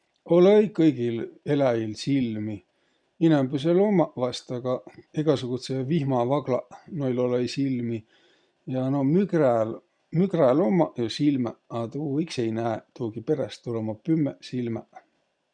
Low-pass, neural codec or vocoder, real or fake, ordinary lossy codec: 9.9 kHz; none; real; none